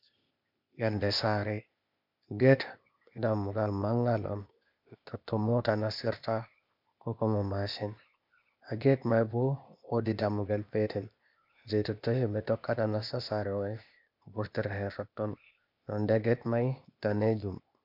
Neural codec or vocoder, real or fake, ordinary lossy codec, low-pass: codec, 16 kHz, 0.8 kbps, ZipCodec; fake; MP3, 48 kbps; 5.4 kHz